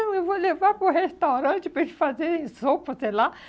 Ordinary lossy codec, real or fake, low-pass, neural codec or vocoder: none; real; none; none